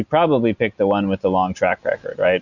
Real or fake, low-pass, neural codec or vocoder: real; 7.2 kHz; none